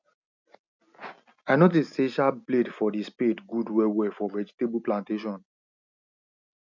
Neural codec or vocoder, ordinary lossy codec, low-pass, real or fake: none; none; 7.2 kHz; real